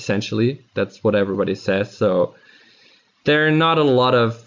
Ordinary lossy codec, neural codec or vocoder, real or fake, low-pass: MP3, 64 kbps; none; real; 7.2 kHz